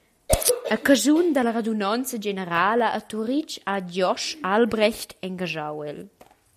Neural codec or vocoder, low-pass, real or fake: none; 14.4 kHz; real